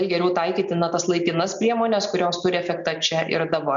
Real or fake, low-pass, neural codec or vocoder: real; 7.2 kHz; none